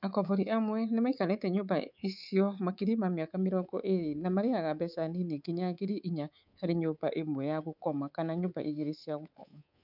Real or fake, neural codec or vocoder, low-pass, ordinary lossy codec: fake; codec, 24 kHz, 3.1 kbps, DualCodec; 5.4 kHz; none